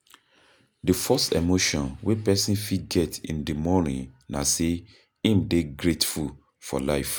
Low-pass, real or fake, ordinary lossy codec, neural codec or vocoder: none; real; none; none